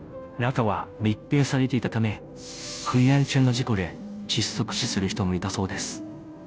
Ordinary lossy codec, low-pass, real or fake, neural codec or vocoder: none; none; fake; codec, 16 kHz, 0.5 kbps, FunCodec, trained on Chinese and English, 25 frames a second